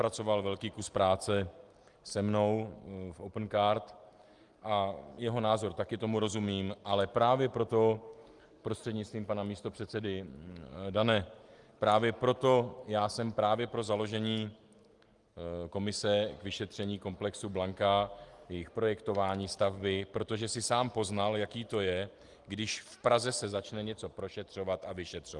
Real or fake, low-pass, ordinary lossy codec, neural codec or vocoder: real; 10.8 kHz; Opus, 24 kbps; none